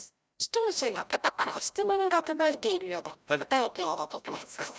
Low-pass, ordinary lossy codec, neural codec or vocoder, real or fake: none; none; codec, 16 kHz, 0.5 kbps, FreqCodec, larger model; fake